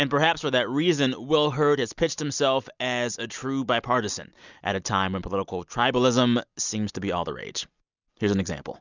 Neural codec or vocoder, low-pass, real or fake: none; 7.2 kHz; real